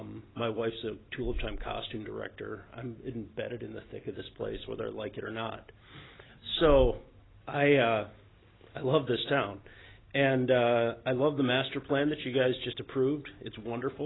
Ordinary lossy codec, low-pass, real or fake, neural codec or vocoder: AAC, 16 kbps; 7.2 kHz; real; none